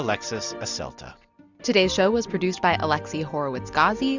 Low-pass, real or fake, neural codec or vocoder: 7.2 kHz; real; none